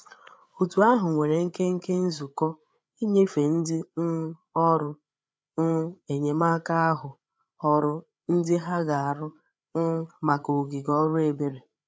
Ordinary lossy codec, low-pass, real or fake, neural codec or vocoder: none; none; fake; codec, 16 kHz, 8 kbps, FreqCodec, larger model